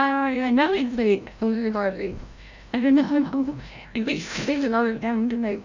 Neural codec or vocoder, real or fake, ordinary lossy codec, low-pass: codec, 16 kHz, 0.5 kbps, FreqCodec, larger model; fake; MP3, 64 kbps; 7.2 kHz